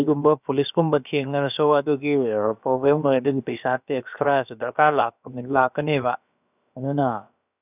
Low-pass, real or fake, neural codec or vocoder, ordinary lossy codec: 3.6 kHz; fake; codec, 16 kHz, about 1 kbps, DyCAST, with the encoder's durations; none